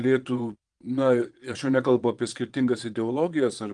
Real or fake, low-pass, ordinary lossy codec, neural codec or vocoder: fake; 9.9 kHz; Opus, 24 kbps; vocoder, 22.05 kHz, 80 mel bands, Vocos